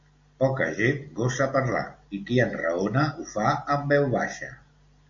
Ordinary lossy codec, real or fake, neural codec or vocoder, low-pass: MP3, 64 kbps; real; none; 7.2 kHz